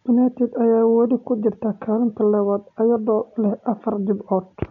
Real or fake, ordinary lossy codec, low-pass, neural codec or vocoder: real; none; 7.2 kHz; none